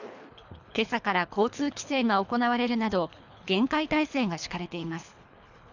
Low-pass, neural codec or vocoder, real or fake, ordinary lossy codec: 7.2 kHz; codec, 24 kHz, 3 kbps, HILCodec; fake; none